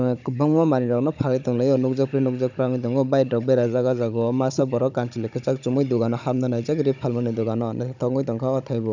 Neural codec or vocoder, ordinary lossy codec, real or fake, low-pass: codec, 16 kHz, 16 kbps, FunCodec, trained on Chinese and English, 50 frames a second; none; fake; 7.2 kHz